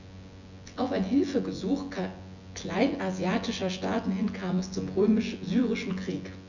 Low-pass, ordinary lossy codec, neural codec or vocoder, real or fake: 7.2 kHz; none; vocoder, 24 kHz, 100 mel bands, Vocos; fake